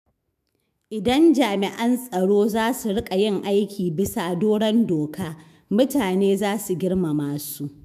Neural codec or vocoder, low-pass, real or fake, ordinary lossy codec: autoencoder, 48 kHz, 128 numbers a frame, DAC-VAE, trained on Japanese speech; 14.4 kHz; fake; MP3, 96 kbps